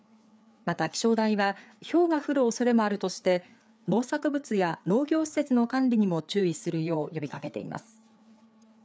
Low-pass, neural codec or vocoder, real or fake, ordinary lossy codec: none; codec, 16 kHz, 4 kbps, FreqCodec, larger model; fake; none